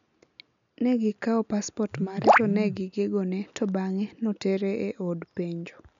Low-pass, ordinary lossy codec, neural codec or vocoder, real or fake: 7.2 kHz; none; none; real